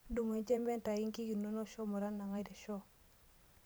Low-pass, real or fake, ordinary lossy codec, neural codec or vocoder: none; fake; none; vocoder, 44.1 kHz, 128 mel bands every 512 samples, BigVGAN v2